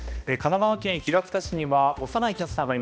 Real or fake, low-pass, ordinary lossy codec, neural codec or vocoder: fake; none; none; codec, 16 kHz, 1 kbps, X-Codec, HuBERT features, trained on balanced general audio